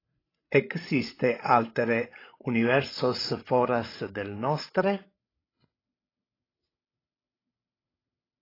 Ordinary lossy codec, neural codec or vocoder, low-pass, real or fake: AAC, 24 kbps; codec, 16 kHz, 16 kbps, FreqCodec, larger model; 5.4 kHz; fake